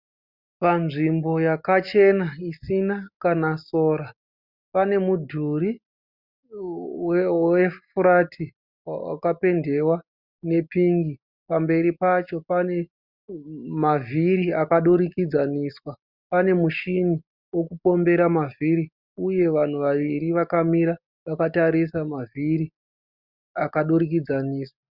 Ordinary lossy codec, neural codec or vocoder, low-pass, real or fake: Opus, 64 kbps; none; 5.4 kHz; real